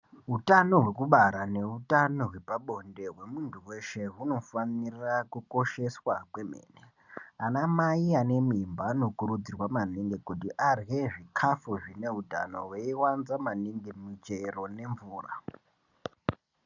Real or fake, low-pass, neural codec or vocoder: real; 7.2 kHz; none